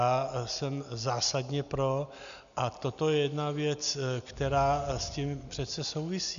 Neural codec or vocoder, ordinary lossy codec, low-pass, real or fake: none; MP3, 96 kbps; 7.2 kHz; real